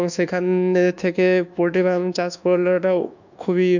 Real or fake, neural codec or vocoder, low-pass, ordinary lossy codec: fake; codec, 24 kHz, 1.2 kbps, DualCodec; 7.2 kHz; none